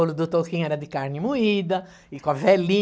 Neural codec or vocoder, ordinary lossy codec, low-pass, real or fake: none; none; none; real